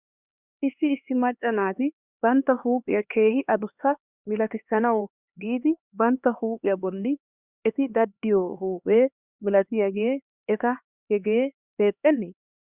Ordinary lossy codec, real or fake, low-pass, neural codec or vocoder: Opus, 64 kbps; fake; 3.6 kHz; codec, 16 kHz, 2 kbps, X-Codec, HuBERT features, trained on LibriSpeech